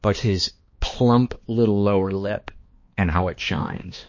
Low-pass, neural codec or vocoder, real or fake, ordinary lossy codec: 7.2 kHz; codec, 16 kHz, 2 kbps, X-Codec, HuBERT features, trained on balanced general audio; fake; MP3, 32 kbps